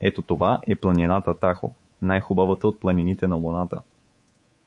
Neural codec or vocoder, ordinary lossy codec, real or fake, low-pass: codec, 24 kHz, 3.1 kbps, DualCodec; MP3, 48 kbps; fake; 10.8 kHz